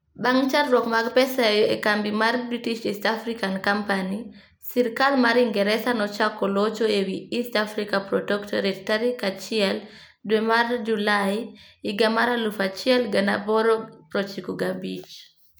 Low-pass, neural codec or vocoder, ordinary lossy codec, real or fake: none; none; none; real